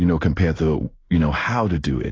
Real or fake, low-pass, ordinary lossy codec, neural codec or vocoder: fake; 7.2 kHz; AAC, 32 kbps; codec, 16 kHz in and 24 kHz out, 1 kbps, XY-Tokenizer